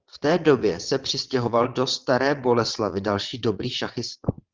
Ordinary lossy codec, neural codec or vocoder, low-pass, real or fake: Opus, 24 kbps; vocoder, 22.05 kHz, 80 mel bands, WaveNeXt; 7.2 kHz; fake